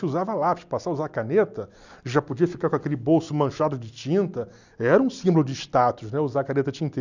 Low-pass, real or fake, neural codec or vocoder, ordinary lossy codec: 7.2 kHz; real; none; none